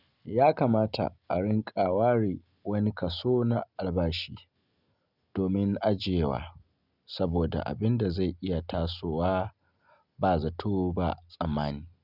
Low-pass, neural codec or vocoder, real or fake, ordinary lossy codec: 5.4 kHz; none; real; none